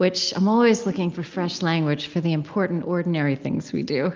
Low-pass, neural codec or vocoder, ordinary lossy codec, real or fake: 7.2 kHz; none; Opus, 24 kbps; real